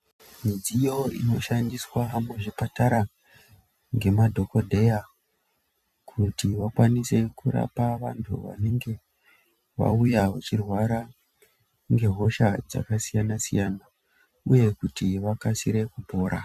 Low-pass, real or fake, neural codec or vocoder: 14.4 kHz; fake; vocoder, 44.1 kHz, 128 mel bands every 256 samples, BigVGAN v2